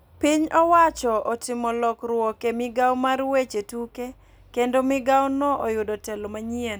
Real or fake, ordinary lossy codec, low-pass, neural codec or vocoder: real; none; none; none